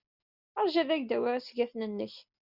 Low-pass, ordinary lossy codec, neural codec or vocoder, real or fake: 5.4 kHz; Opus, 64 kbps; none; real